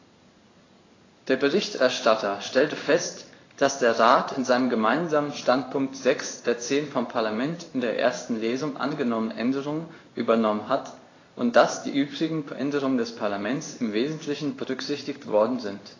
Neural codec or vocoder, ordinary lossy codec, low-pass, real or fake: codec, 16 kHz in and 24 kHz out, 1 kbps, XY-Tokenizer; AAC, 32 kbps; 7.2 kHz; fake